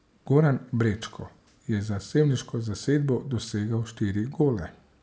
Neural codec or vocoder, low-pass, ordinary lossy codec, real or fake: none; none; none; real